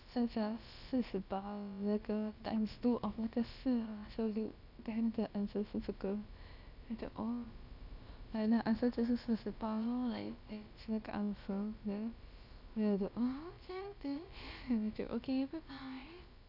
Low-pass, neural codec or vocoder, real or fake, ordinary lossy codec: 5.4 kHz; codec, 16 kHz, about 1 kbps, DyCAST, with the encoder's durations; fake; none